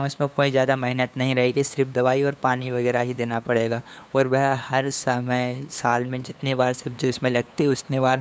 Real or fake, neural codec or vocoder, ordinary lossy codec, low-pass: fake; codec, 16 kHz, 2 kbps, FunCodec, trained on LibriTTS, 25 frames a second; none; none